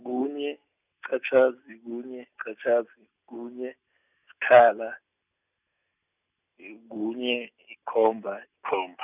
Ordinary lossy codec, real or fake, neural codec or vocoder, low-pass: none; real; none; 3.6 kHz